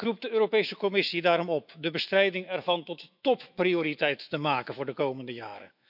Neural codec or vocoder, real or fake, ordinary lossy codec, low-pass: autoencoder, 48 kHz, 128 numbers a frame, DAC-VAE, trained on Japanese speech; fake; none; 5.4 kHz